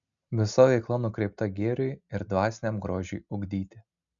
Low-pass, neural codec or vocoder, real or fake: 7.2 kHz; none; real